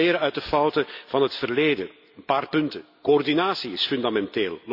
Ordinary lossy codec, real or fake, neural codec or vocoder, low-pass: none; real; none; 5.4 kHz